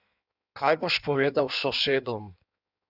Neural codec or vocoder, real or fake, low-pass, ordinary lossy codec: codec, 16 kHz in and 24 kHz out, 1.1 kbps, FireRedTTS-2 codec; fake; 5.4 kHz; none